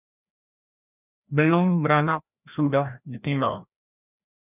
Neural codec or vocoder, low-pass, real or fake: codec, 16 kHz, 1 kbps, FreqCodec, larger model; 3.6 kHz; fake